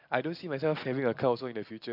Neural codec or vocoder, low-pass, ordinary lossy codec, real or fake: none; 5.4 kHz; AAC, 48 kbps; real